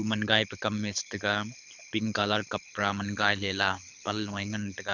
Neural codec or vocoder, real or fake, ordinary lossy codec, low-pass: codec, 24 kHz, 6 kbps, HILCodec; fake; none; 7.2 kHz